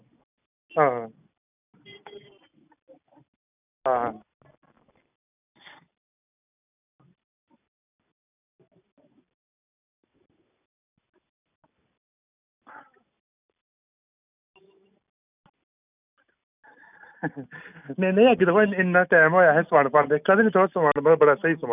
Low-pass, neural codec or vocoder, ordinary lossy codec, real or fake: 3.6 kHz; none; none; real